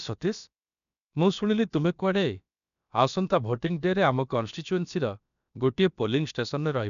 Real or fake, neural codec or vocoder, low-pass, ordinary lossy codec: fake; codec, 16 kHz, about 1 kbps, DyCAST, with the encoder's durations; 7.2 kHz; none